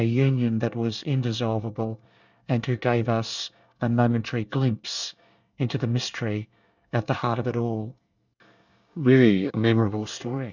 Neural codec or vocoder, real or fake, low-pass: codec, 24 kHz, 1 kbps, SNAC; fake; 7.2 kHz